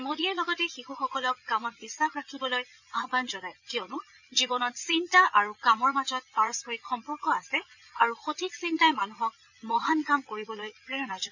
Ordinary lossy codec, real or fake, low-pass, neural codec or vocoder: none; fake; 7.2 kHz; vocoder, 22.05 kHz, 80 mel bands, Vocos